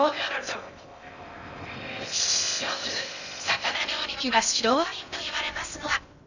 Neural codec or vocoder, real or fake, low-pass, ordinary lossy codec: codec, 16 kHz in and 24 kHz out, 0.6 kbps, FocalCodec, streaming, 2048 codes; fake; 7.2 kHz; none